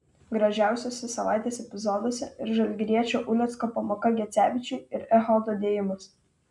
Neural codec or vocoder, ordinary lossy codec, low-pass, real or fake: none; MP3, 96 kbps; 10.8 kHz; real